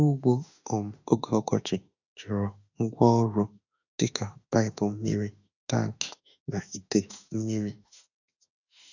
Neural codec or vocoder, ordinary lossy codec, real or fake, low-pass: autoencoder, 48 kHz, 32 numbers a frame, DAC-VAE, trained on Japanese speech; none; fake; 7.2 kHz